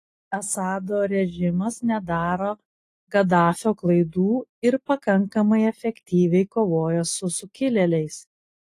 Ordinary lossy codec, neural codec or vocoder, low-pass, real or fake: AAC, 48 kbps; none; 14.4 kHz; real